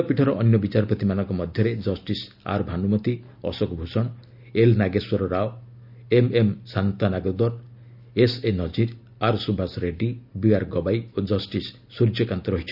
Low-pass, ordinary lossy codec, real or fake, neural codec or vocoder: 5.4 kHz; none; real; none